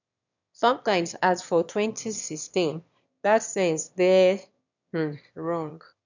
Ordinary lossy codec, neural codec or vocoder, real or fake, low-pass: none; autoencoder, 22.05 kHz, a latent of 192 numbers a frame, VITS, trained on one speaker; fake; 7.2 kHz